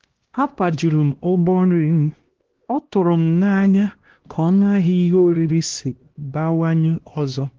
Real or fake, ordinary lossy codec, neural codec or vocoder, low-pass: fake; Opus, 16 kbps; codec, 16 kHz, 1 kbps, X-Codec, HuBERT features, trained on LibriSpeech; 7.2 kHz